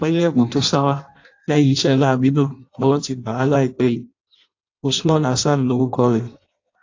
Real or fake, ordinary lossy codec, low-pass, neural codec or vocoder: fake; AAC, 48 kbps; 7.2 kHz; codec, 16 kHz in and 24 kHz out, 0.6 kbps, FireRedTTS-2 codec